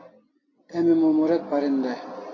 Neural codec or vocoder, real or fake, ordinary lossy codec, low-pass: none; real; AAC, 32 kbps; 7.2 kHz